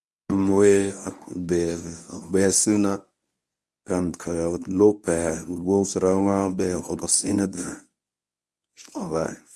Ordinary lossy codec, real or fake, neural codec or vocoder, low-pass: none; fake; codec, 24 kHz, 0.9 kbps, WavTokenizer, medium speech release version 1; none